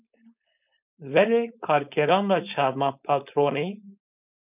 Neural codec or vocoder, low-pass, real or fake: codec, 16 kHz, 4.8 kbps, FACodec; 3.6 kHz; fake